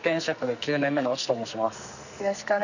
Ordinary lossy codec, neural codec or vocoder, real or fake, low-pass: none; codec, 44.1 kHz, 2.6 kbps, SNAC; fake; 7.2 kHz